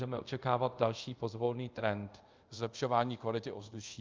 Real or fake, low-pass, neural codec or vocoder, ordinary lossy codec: fake; 7.2 kHz; codec, 24 kHz, 0.5 kbps, DualCodec; Opus, 24 kbps